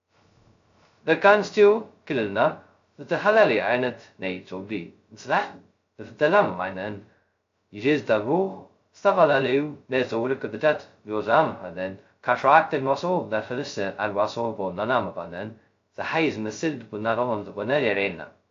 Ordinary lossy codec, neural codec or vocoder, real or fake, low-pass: AAC, 48 kbps; codec, 16 kHz, 0.2 kbps, FocalCodec; fake; 7.2 kHz